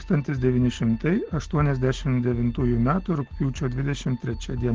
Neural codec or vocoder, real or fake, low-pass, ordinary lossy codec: none; real; 7.2 kHz; Opus, 16 kbps